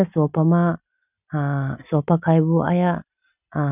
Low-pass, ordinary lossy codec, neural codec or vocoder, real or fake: 3.6 kHz; none; none; real